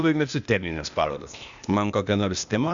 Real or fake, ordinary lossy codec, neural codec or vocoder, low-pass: fake; Opus, 64 kbps; codec, 16 kHz, 0.8 kbps, ZipCodec; 7.2 kHz